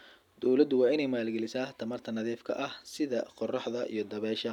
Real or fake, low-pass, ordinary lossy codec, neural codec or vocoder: real; 19.8 kHz; none; none